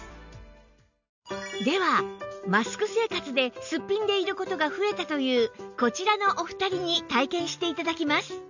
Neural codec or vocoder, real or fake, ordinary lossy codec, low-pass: none; real; none; 7.2 kHz